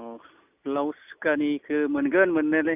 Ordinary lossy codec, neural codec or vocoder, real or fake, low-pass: none; none; real; 3.6 kHz